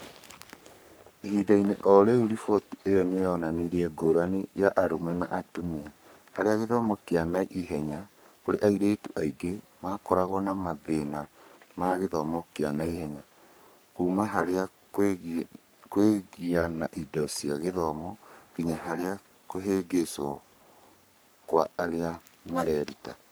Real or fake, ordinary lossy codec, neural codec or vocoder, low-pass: fake; none; codec, 44.1 kHz, 3.4 kbps, Pupu-Codec; none